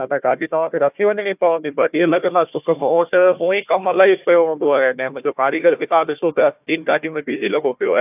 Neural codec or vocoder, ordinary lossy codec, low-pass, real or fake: codec, 16 kHz, 1 kbps, FunCodec, trained on Chinese and English, 50 frames a second; AAC, 32 kbps; 3.6 kHz; fake